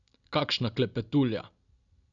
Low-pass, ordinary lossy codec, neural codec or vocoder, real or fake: 7.2 kHz; none; none; real